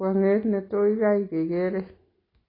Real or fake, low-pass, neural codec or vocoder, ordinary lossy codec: real; 5.4 kHz; none; AAC, 24 kbps